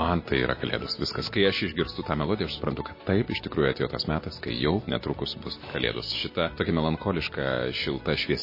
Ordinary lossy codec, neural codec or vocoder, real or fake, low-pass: MP3, 24 kbps; none; real; 5.4 kHz